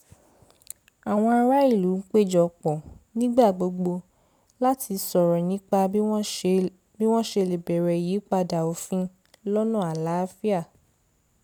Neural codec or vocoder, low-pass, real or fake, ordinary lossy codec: none; none; real; none